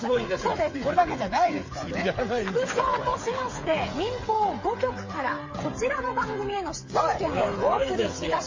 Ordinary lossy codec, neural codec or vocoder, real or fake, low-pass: MP3, 32 kbps; codec, 16 kHz, 8 kbps, FreqCodec, smaller model; fake; 7.2 kHz